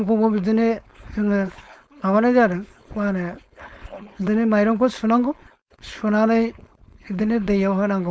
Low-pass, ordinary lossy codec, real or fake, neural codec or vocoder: none; none; fake; codec, 16 kHz, 4.8 kbps, FACodec